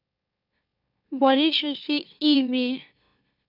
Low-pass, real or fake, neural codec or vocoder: 5.4 kHz; fake; autoencoder, 44.1 kHz, a latent of 192 numbers a frame, MeloTTS